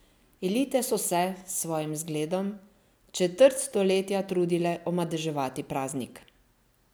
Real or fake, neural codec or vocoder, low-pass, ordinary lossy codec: real; none; none; none